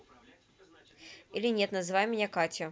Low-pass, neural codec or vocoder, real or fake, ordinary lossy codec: none; none; real; none